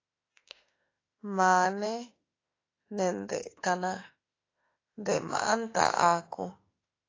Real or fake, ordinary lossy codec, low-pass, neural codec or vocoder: fake; AAC, 32 kbps; 7.2 kHz; autoencoder, 48 kHz, 32 numbers a frame, DAC-VAE, trained on Japanese speech